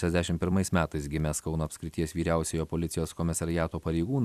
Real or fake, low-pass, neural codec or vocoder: fake; 14.4 kHz; vocoder, 44.1 kHz, 128 mel bands every 256 samples, BigVGAN v2